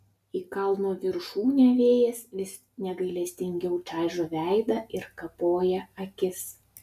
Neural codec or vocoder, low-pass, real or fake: none; 14.4 kHz; real